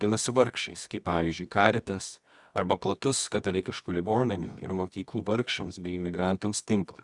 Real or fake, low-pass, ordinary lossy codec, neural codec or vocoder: fake; 10.8 kHz; Opus, 64 kbps; codec, 24 kHz, 0.9 kbps, WavTokenizer, medium music audio release